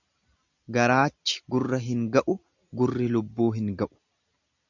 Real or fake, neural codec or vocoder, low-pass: real; none; 7.2 kHz